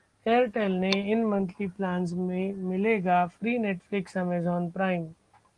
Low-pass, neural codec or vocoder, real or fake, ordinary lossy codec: 10.8 kHz; none; real; Opus, 24 kbps